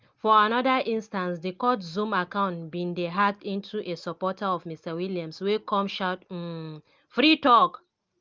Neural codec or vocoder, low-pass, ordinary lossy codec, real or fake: none; 7.2 kHz; Opus, 32 kbps; real